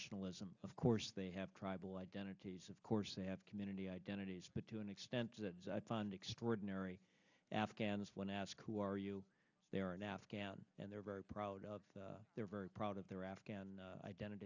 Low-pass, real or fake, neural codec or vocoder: 7.2 kHz; real; none